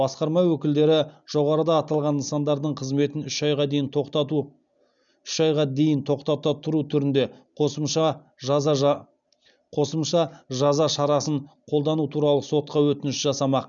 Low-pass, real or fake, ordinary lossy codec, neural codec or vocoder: 7.2 kHz; real; none; none